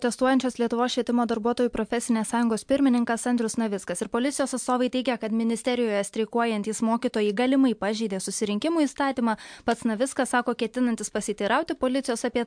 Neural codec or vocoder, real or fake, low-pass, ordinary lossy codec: none; real; 9.9 kHz; MP3, 64 kbps